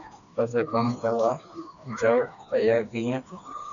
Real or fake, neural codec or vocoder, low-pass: fake; codec, 16 kHz, 2 kbps, FreqCodec, smaller model; 7.2 kHz